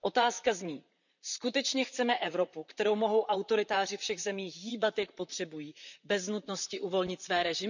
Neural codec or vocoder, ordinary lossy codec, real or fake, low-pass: vocoder, 44.1 kHz, 128 mel bands, Pupu-Vocoder; none; fake; 7.2 kHz